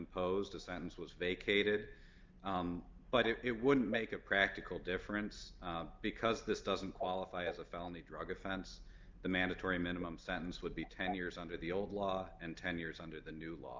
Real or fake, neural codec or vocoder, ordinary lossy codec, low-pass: real; none; Opus, 24 kbps; 7.2 kHz